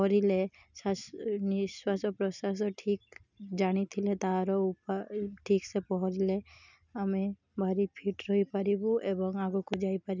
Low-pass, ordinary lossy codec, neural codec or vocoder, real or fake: 7.2 kHz; none; none; real